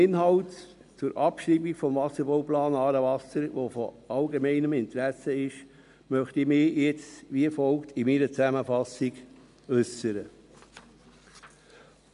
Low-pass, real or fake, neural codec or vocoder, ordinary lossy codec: 10.8 kHz; real; none; MP3, 64 kbps